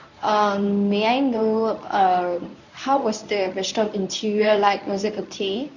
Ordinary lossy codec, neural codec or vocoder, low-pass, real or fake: none; codec, 24 kHz, 0.9 kbps, WavTokenizer, medium speech release version 1; 7.2 kHz; fake